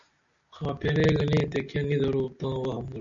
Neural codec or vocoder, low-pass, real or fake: none; 7.2 kHz; real